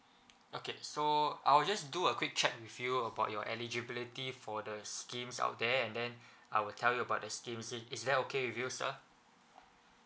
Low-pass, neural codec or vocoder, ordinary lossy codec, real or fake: none; none; none; real